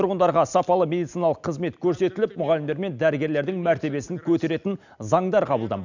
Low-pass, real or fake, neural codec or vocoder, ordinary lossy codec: 7.2 kHz; real; none; none